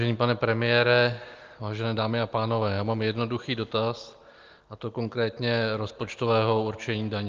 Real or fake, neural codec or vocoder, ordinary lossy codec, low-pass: real; none; Opus, 24 kbps; 7.2 kHz